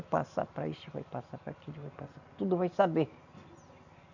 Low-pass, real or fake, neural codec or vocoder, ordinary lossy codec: 7.2 kHz; real; none; none